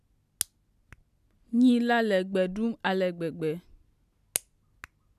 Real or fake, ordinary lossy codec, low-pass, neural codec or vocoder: real; none; 14.4 kHz; none